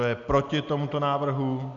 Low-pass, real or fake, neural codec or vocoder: 7.2 kHz; real; none